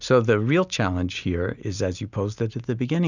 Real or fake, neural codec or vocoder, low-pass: real; none; 7.2 kHz